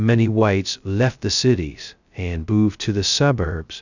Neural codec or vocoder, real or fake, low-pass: codec, 16 kHz, 0.2 kbps, FocalCodec; fake; 7.2 kHz